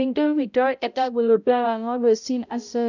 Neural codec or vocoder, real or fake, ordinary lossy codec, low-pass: codec, 16 kHz, 0.5 kbps, X-Codec, HuBERT features, trained on balanced general audio; fake; none; 7.2 kHz